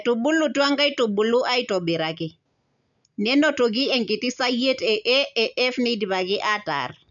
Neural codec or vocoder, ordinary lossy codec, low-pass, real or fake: none; none; 7.2 kHz; real